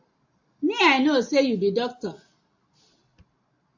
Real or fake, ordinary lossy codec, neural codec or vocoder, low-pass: real; AAC, 48 kbps; none; 7.2 kHz